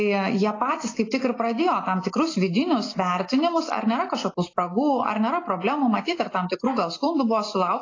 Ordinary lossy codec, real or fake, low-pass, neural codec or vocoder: AAC, 32 kbps; real; 7.2 kHz; none